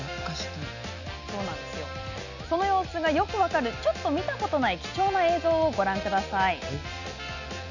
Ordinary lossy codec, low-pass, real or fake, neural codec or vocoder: none; 7.2 kHz; real; none